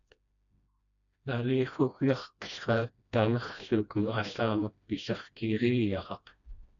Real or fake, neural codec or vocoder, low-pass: fake; codec, 16 kHz, 1 kbps, FreqCodec, smaller model; 7.2 kHz